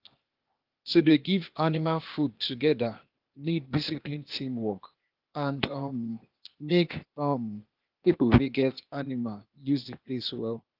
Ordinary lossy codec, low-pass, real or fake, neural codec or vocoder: Opus, 32 kbps; 5.4 kHz; fake; codec, 16 kHz, 0.8 kbps, ZipCodec